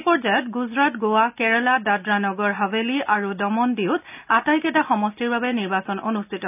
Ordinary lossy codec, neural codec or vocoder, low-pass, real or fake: none; none; 3.6 kHz; real